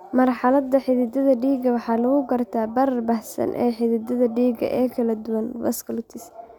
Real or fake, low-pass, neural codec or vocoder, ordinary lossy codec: real; 19.8 kHz; none; none